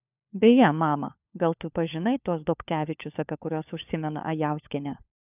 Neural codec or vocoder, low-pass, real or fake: codec, 16 kHz, 4 kbps, FunCodec, trained on LibriTTS, 50 frames a second; 3.6 kHz; fake